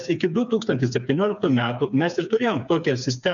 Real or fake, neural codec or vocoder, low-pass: fake; codec, 16 kHz, 4 kbps, FreqCodec, smaller model; 7.2 kHz